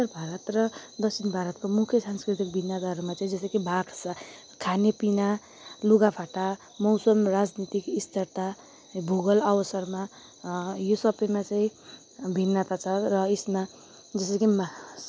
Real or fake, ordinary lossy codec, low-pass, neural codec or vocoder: real; none; none; none